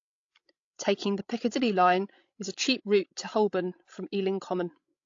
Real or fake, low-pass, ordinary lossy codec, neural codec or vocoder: fake; 7.2 kHz; AAC, 48 kbps; codec, 16 kHz, 16 kbps, FreqCodec, larger model